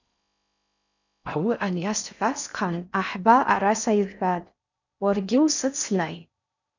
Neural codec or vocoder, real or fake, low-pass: codec, 16 kHz in and 24 kHz out, 0.6 kbps, FocalCodec, streaming, 4096 codes; fake; 7.2 kHz